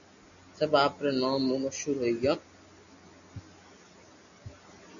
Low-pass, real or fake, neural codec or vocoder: 7.2 kHz; real; none